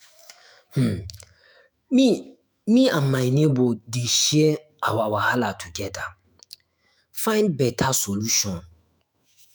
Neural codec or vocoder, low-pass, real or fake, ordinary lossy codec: autoencoder, 48 kHz, 128 numbers a frame, DAC-VAE, trained on Japanese speech; none; fake; none